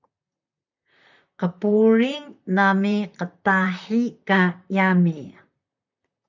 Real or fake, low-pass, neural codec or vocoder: fake; 7.2 kHz; vocoder, 44.1 kHz, 128 mel bands, Pupu-Vocoder